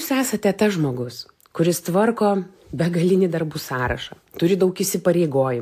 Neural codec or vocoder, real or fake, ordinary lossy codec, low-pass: none; real; AAC, 64 kbps; 14.4 kHz